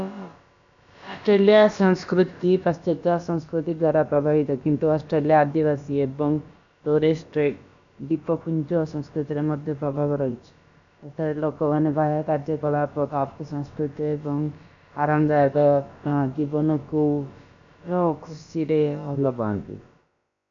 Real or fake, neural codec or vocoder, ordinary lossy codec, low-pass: fake; codec, 16 kHz, about 1 kbps, DyCAST, with the encoder's durations; none; 7.2 kHz